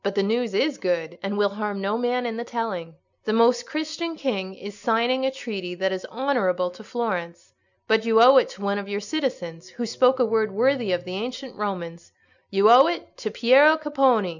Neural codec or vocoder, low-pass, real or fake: none; 7.2 kHz; real